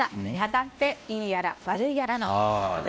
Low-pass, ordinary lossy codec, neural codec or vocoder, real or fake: none; none; codec, 16 kHz, 1 kbps, X-Codec, WavLM features, trained on Multilingual LibriSpeech; fake